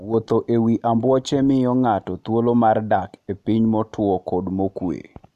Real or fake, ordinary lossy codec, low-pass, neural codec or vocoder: real; none; 14.4 kHz; none